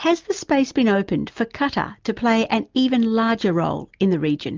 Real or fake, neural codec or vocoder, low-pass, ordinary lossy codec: real; none; 7.2 kHz; Opus, 32 kbps